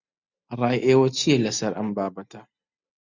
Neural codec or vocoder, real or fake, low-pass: none; real; 7.2 kHz